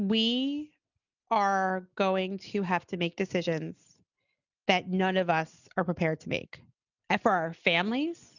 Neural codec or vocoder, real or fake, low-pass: none; real; 7.2 kHz